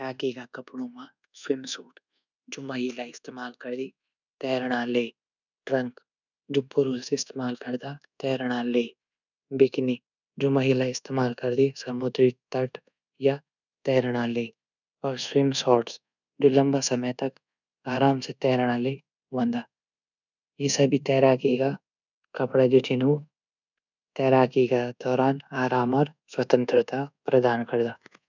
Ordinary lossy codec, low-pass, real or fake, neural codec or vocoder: none; 7.2 kHz; fake; codec, 24 kHz, 1.2 kbps, DualCodec